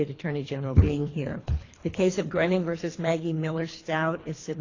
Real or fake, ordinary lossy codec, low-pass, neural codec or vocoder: fake; AAC, 32 kbps; 7.2 kHz; codec, 24 kHz, 3 kbps, HILCodec